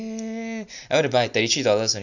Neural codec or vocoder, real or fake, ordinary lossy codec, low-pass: none; real; none; 7.2 kHz